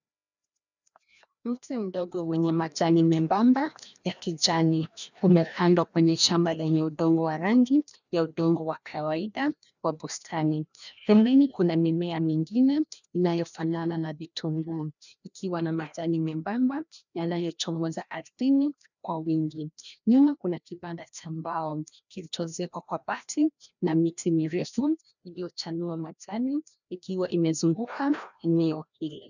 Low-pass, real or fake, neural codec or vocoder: 7.2 kHz; fake; codec, 16 kHz, 1 kbps, FreqCodec, larger model